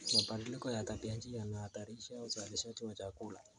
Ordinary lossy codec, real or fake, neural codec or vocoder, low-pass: none; real; none; 9.9 kHz